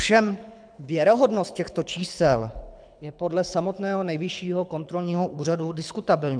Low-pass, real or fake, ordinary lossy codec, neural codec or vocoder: 9.9 kHz; fake; MP3, 96 kbps; codec, 24 kHz, 6 kbps, HILCodec